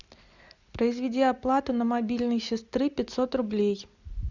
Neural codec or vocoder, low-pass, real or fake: none; 7.2 kHz; real